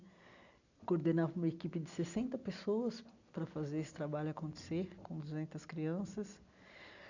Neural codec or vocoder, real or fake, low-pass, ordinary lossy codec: none; real; 7.2 kHz; none